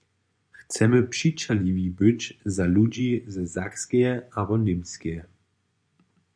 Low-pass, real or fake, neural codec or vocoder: 9.9 kHz; fake; vocoder, 24 kHz, 100 mel bands, Vocos